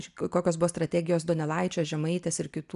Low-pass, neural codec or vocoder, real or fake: 10.8 kHz; none; real